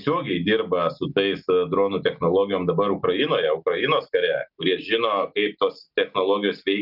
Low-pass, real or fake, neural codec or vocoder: 5.4 kHz; real; none